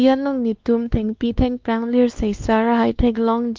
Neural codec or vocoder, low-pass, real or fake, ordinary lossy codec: codec, 16 kHz, 2 kbps, X-Codec, WavLM features, trained on Multilingual LibriSpeech; 7.2 kHz; fake; Opus, 32 kbps